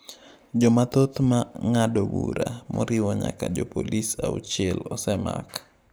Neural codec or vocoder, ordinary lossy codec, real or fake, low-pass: none; none; real; none